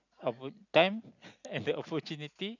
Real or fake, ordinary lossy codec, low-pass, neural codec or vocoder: real; none; 7.2 kHz; none